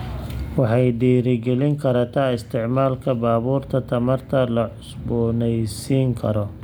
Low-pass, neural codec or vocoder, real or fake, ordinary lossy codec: none; none; real; none